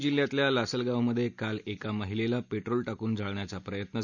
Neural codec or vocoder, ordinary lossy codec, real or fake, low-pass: none; none; real; 7.2 kHz